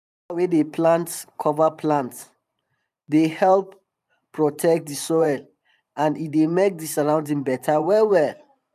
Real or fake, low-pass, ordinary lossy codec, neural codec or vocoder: fake; 14.4 kHz; none; vocoder, 44.1 kHz, 128 mel bands every 512 samples, BigVGAN v2